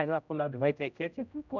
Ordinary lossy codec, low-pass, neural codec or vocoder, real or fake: none; 7.2 kHz; codec, 16 kHz, 0.5 kbps, X-Codec, HuBERT features, trained on general audio; fake